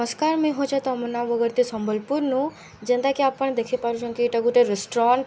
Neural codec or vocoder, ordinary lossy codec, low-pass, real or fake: none; none; none; real